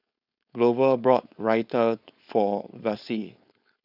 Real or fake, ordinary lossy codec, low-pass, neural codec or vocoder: fake; none; 5.4 kHz; codec, 16 kHz, 4.8 kbps, FACodec